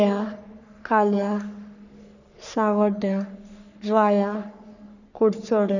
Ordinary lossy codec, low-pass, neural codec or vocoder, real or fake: none; 7.2 kHz; codec, 44.1 kHz, 3.4 kbps, Pupu-Codec; fake